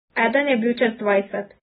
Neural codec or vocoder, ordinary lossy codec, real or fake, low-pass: none; AAC, 16 kbps; real; 7.2 kHz